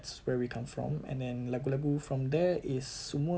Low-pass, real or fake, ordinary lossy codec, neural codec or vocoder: none; real; none; none